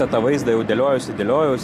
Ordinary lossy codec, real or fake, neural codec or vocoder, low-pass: AAC, 64 kbps; real; none; 14.4 kHz